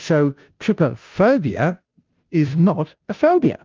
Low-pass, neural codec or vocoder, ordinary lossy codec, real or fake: 7.2 kHz; codec, 16 kHz, 0.5 kbps, FunCodec, trained on Chinese and English, 25 frames a second; Opus, 24 kbps; fake